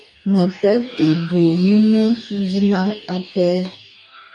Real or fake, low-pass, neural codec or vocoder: fake; 10.8 kHz; codec, 44.1 kHz, 2.6 kbps, DAC